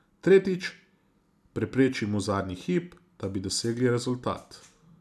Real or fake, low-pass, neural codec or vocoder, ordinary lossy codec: real; none; none; none